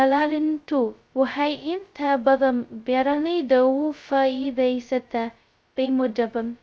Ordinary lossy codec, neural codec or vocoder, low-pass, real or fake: none; codec, 16 kHz, 0.2 kbps, FocalCodec; none; fake